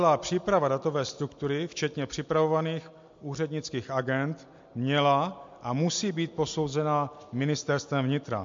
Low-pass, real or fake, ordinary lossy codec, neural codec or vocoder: 7.2 kHz; real; MP3, 48 kbps; none